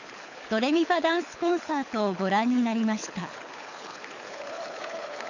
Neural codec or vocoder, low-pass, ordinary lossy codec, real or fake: codec, 24 kHz, 6 kbps, HILCodec; 7.2 kHz; none; fake